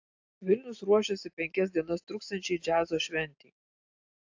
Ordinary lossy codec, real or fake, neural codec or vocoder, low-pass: AAC, 48 kbps; real; none; 7.2 kHz